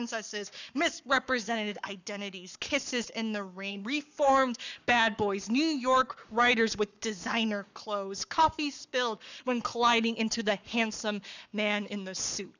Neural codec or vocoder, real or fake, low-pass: codec, 44.1 kHz, 7.8 kbps, Pupu-Codec; fake; 7.2 kHz